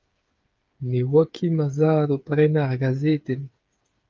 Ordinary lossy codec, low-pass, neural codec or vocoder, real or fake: Opus, 24 kbps; 7.2 kHz; codec, 16 kHz, 8 kbps, FreqCodec, smaller model; fake